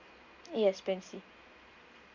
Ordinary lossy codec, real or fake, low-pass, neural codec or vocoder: Opus, 64 kbps; real; 7.2 kHz; none